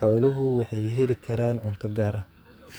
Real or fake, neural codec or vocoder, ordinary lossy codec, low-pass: fake; codec, 44.1 kHz, 2.6 kbps, SNAC; none; none